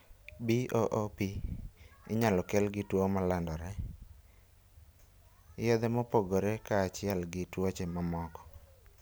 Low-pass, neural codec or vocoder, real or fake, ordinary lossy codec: none; none; real; none